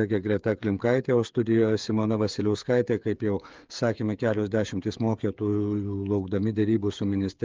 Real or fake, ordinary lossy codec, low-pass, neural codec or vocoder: fake; Opus, 24 kbps; 7.2 kHz; codec, 16 kHz, 8 kbps, FreqCodec, smaller model